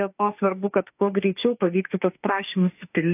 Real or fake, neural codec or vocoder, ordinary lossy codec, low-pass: fake; autoencoder, 48 kHz, 32 numbers a frame, DAC-VAE, trained on Japanese speech; AAC, 32 kbps; 3.6 kHz